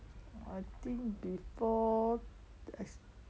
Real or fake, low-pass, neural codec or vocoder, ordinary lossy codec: real; none; none; none